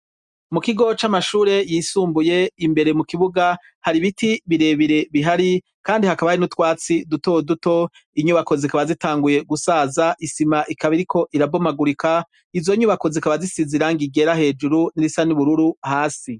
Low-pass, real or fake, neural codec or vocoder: 10.8 kHz; real; none